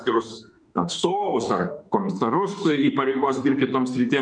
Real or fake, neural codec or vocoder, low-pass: fake; autoencoder, 48 kHz, 32 numbers a frame, DAC-VAE, trained on Japanese speech; 9.9 kHz